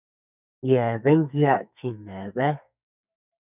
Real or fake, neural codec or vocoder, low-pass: fake; codec, 32 kHz, 1.9 kbps, SNAC; 3.6 kHz